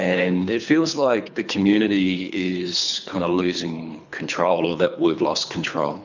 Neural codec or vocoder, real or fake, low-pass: codec, 24 kHz, 3 kbps, HILCodec; fake; 7.2 kHz